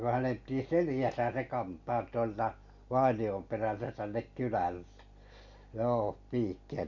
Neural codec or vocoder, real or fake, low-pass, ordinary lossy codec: none; real; 7.2 kHz; none